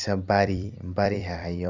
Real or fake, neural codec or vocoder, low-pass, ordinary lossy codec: fake; vocoder, 44.1 kHz, 128 mel bands every 256 samples, BigVGAN v2; 7.2 kHz; none